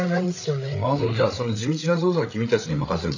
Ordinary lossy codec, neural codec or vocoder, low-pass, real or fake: AAC, 48 kbps; codec, 16 kHz, 8 kbps, FreqCodec, larger model; 7.2 kHz; fake